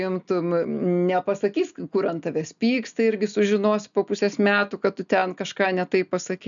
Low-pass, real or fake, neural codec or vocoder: 7.2 kHz; real; none